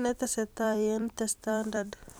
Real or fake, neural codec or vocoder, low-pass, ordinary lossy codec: fake; vocoder, 44.1 kHz, 128 mel bands every 256 samples, BigVGAN v2; none; none